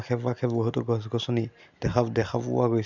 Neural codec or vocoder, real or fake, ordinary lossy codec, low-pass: none; real; none; 7.2 kHz